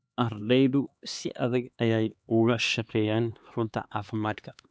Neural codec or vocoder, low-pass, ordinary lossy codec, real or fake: codec, 16 kHz, 2 kbps, X-Codec, HuBERT features, trained on LibriSpeech; none; none; fake